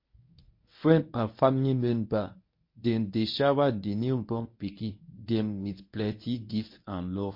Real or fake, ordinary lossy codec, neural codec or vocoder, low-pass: fake; MP3, 32 kbps; codec, 24 kHz, 0.9 kbps, WavTokenizer, medium speech release version 1; 5.4 kHz